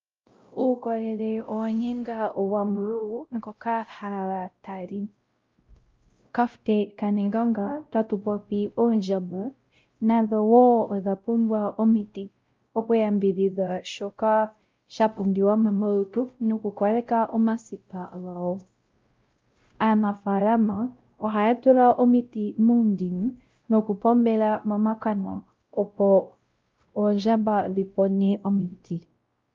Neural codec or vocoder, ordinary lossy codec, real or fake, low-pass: codec, 16 kHz, 0.5 kbps, X-Codec, WavLM features, trained on Multilingual LibriSpeech; Opus, 24 kbps; fake; 7.2 kHz